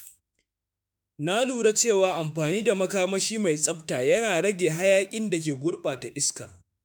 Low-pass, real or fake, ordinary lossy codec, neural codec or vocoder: none; fake; none; autoencoder, 48 kHz, 32 numbers a frame, DAC-VAE, trained on Japanese speech